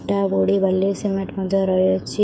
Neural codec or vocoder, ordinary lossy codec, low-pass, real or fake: codec, 16 kHz, 8 kbps, FreqCodec, smaller model; none; none; fake